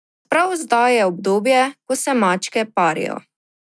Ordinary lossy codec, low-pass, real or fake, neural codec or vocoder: none; none; real; none